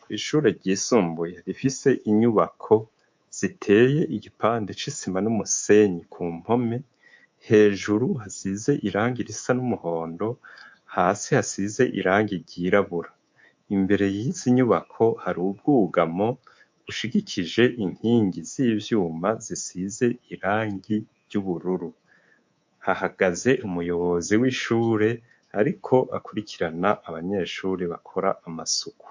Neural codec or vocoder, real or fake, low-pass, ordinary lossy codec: codec, 24 kHz, 3.1 kbps, DualCodec; fake; 7.2 kHz; MP3, 48 kbps